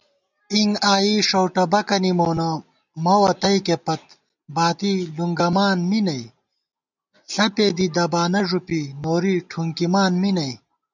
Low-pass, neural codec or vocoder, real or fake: 7.2 kHz; none; real